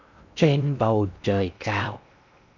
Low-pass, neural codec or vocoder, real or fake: 7.2 kHz; codec, 16 kHz in and 24 kHz out, 0.6 kbps, FocalCodec, streaming, 2048 codes; fake